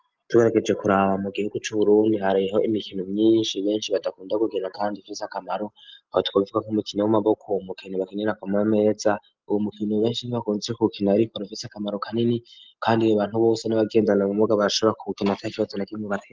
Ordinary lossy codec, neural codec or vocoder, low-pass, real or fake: Opus, 24 kbps; none; 7.2 kHz; real